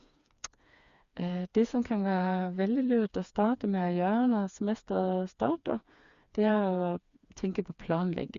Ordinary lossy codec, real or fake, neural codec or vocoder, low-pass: Opus, 64 kbps; fake; codec, 16 kHz, 4 kbps, FreqCodec, smaller model; 7.2 kHz